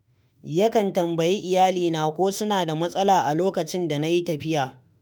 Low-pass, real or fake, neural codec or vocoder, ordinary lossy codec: none; fake; autoencoder, 48 kHz, 32 numbers a frame, DAC-VAE, trained on Japanese speech; none